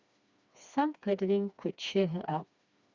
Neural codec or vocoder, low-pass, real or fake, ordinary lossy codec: codec, 16 kHz, 2 kbps, FreqCodec, smaller model; 7.2 kHz; fake; Opus, 64 kbps